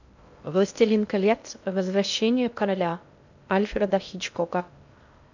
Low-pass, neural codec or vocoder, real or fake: 7.2 kHz; codec, 16 kHz in and 24 kHz out, 0.6 kbps, FocalCodec, streaming, 2048 codes; fake